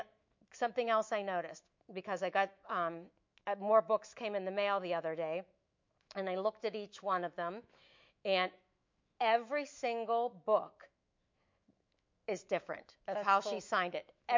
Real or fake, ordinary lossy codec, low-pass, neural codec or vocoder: fake; MP3, 48 kbps; 7.2 kHz; autoencoder, 48 kHz, 128 numbers a frame, DAC-VAE, trained on Japanese speech